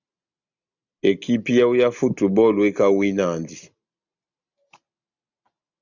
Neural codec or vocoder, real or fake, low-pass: none; real; 7.2 kHz